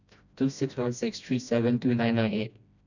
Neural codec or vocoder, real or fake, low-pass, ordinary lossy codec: codec, 16 kHz, 1 kbps, FreqCodec, smaller model; fake; 7.2 kHz; none